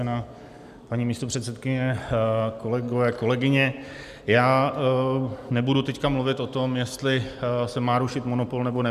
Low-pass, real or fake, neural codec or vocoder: 14.4 kHz; real; none